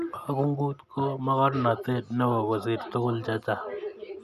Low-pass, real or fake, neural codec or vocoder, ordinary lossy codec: 14.4 kHz; real; none; none